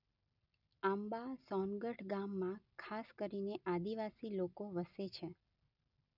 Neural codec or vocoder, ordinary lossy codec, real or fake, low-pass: none; none; real; 5.4 kHz